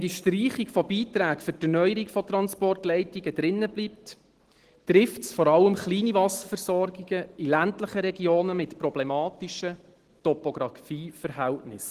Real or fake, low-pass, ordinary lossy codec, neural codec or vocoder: real; 14.4 kHz; Opus, 16 kbps; none